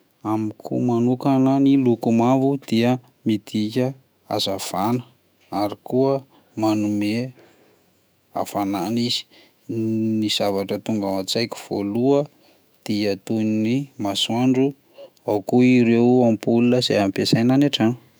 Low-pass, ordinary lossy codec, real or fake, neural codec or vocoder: none; none; fake; autoencoder, 48 kHz, 128 numbers a frame, DAC-VAE, trained on Japanese speech